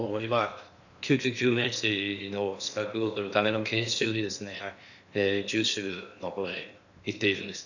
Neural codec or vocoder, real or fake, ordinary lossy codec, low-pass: codec, 16 kHz in and 24 kHz out, 0.8 kbps, FocalCodec, streaming, 65536 codes; fake; none; 7.2 kHz